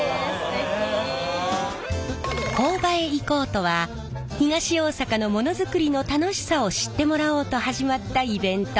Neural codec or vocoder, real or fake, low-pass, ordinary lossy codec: none; real; none; none